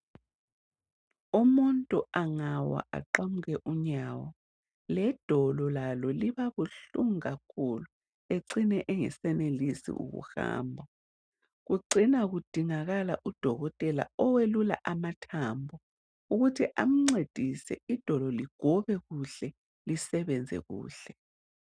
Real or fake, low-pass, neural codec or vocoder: real; 9.9 kHz; none